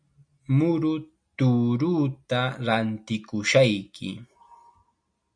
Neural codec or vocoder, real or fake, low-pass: none; real; 9.9 kHz